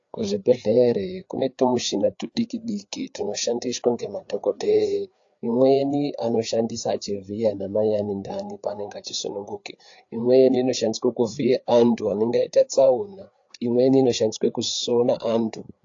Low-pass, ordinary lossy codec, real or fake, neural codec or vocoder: 7.2 kHz; AAC, 48 kbps; fake; codec, 16 kHz, 4 kbps, FreqCodec, larger model